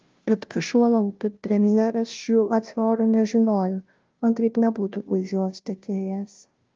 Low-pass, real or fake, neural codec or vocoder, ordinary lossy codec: 7.2 kHz; fake; codec, 16 kHz, 0.5 kbps, FunCodec, trained on Chinese and English, 25 frames a second; Opus, 24 kbps